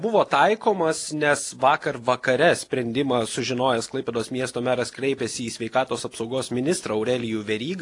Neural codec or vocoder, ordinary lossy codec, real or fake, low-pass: none; AAC, 48 kbps; real; 10.8 kHz